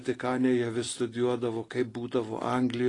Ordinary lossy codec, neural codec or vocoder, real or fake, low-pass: AAC, 32 kbps; none; real; 10.8 kHz